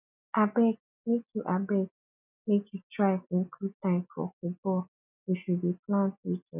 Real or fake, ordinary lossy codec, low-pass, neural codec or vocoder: real; none; 3.6 kHz; none